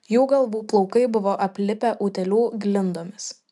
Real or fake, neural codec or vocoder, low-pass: real; none; 10.8 kHz